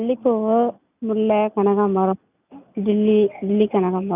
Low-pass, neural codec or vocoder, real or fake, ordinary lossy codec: 3.6 kHz; none; real; none